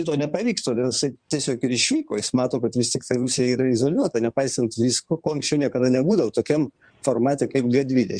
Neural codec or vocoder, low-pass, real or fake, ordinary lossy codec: codec, 16 kHz in and 24 kHz out, 2.2 kbps, FireRedTTS-2 codec; 9.9 kHz; fake; Opus, 64 kbps